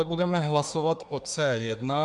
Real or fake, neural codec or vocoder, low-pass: fake; codec, 24 kHz, 1 kbps, SNAC; 10.8 kHz